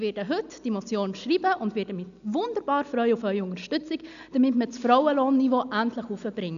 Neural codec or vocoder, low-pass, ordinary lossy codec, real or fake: none; 7.2 kHz; none; real